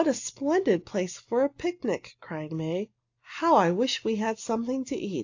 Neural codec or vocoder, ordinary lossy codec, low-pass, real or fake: none; AAC, 48 kbps; 7.2 kHz; real